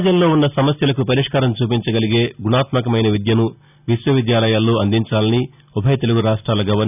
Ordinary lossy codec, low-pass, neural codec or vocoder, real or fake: none; 3.6 kHz; none; real